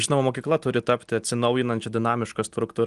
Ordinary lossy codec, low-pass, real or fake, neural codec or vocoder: Opus, 24 kbps; 10.8 kHz; real; none